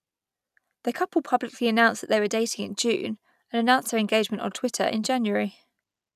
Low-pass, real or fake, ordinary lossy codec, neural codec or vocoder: 14.4 kHz; real; none; none